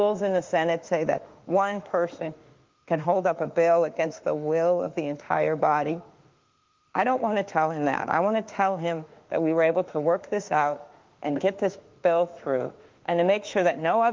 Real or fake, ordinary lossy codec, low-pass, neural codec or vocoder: fake; Opus, 32 kbps; 7.2 kHz; autoencoder, 48 kHz, 32 numbers a frame, DAC-VAE, trained on Japanese speech